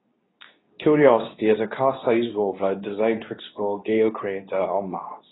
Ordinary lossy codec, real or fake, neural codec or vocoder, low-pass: AAC, 16 kbps; fake; codec, 24 kHz, 0.9 kbps, WavTokenizer, medium speech release version 2; 7.2 kHz